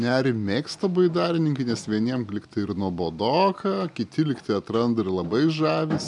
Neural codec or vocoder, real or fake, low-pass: none; real; 10.8 kHz